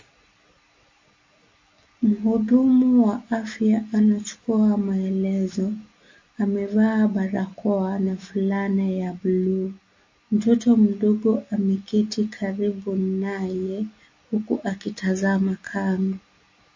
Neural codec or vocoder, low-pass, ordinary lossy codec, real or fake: none; 7.2 kHz; MP3, 32 kbps; real